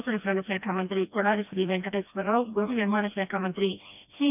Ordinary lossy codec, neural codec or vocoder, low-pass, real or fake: none; codec, 16 kHz, 1 kbps, FreqCodec, smaller model; 3.6 kHz; fake